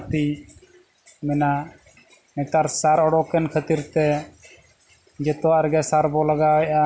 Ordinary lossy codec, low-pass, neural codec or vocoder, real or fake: none; none; none; real